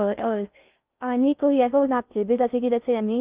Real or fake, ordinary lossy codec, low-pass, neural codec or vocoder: fake; Opus, 24 kbps; 3.6 kHz; codec, 16 kHz in and 24 kHz out, 0.6 kbps, FocalCodec, streaming, 2048 codes